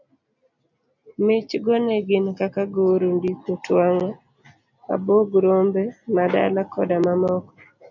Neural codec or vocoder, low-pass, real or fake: none; 7.2 kHz; real